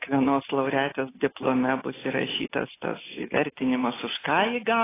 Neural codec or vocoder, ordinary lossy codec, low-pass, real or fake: none; AAC, 16 kbps; 3.6 kHz; real